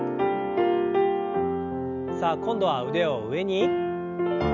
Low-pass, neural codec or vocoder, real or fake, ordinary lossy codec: 7.2 kHz; none; real; none